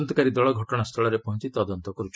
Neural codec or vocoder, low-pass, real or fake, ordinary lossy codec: none; none; real; none